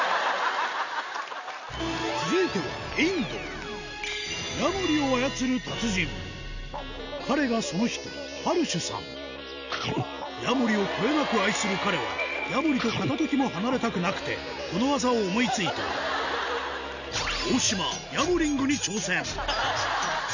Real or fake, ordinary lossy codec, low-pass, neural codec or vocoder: real; none; 7.2 kHz; none